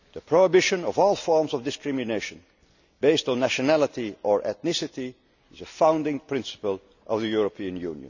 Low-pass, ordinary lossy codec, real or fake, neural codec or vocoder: 7.2 kHz; none; real; none